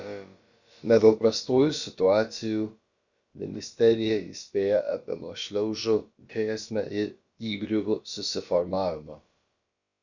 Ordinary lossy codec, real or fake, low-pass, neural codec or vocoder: Opus, 64 kbps; fake; 7.2 kHz; codec, 16 kHz, about 1 kbps, DyCAST, with the encoder's durations